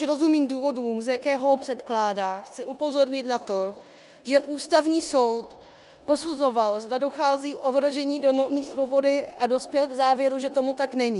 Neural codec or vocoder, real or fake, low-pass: codec, 16 kHz in and 24 kHz out, 0.9 kbps, LongCat-Audio-Codec, four codebook decoder; fake; 10.8 kHz